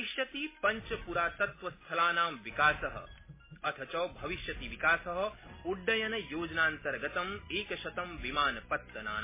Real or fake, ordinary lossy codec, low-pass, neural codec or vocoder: real; MP3, 16 kbps; 3.6 kHz; none